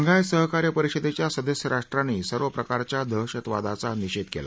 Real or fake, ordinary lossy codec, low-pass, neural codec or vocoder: real; none; none; none